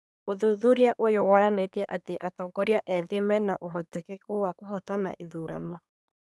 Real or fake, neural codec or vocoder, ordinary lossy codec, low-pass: fake; codec, 24 kHz, 1 kbps, SNAC; none; none